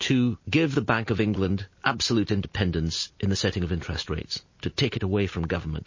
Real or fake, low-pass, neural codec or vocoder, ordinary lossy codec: real; 7.2 kHz; none; MP3, 32 kbps